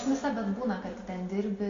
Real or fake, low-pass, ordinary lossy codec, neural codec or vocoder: real; 7.2 kHz; MP3, 48 kbps; none